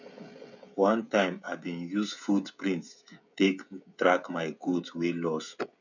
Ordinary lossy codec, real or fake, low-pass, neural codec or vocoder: none; fake; 7.2 kHz; codec, 16 kHz, 16 kbps, FreqCodec, smaller model